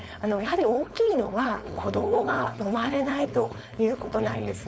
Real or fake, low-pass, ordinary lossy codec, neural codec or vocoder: fake; none; none; codec, 16 kHz, 4.8 kbps, FACodec